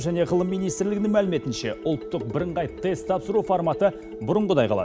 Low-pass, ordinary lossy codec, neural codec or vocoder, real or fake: none; none; none; real